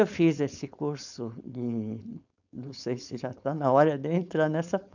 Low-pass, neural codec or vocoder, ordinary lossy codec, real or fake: 7.2 kHz; codec, 16 kHz, 4.8 kbps, FACodec; none; fake